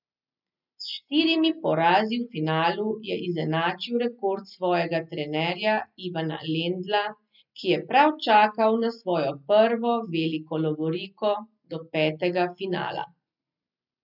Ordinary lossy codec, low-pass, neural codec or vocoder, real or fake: none; 5.4 kHz; none; real